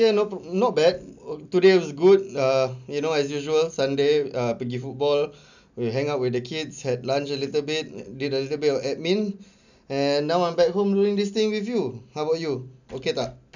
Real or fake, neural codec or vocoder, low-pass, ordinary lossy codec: real; none; 7.2 kHz; none